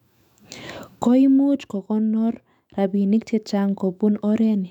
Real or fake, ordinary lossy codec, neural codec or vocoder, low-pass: fake; none; autoencoder, 48 kHz, 128 numbers a frame, DAC-VAE, trained on Japanese speech; 19.8 kHz